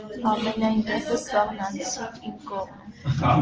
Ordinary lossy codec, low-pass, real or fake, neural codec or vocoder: Opus, 16 kbps; 7.2 kHz; real; none